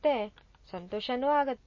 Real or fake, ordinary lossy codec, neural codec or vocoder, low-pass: real; MP3, 32 kbps; none; 7.2 kHz